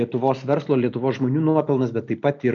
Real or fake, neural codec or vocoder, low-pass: real; none; 7.2 kHz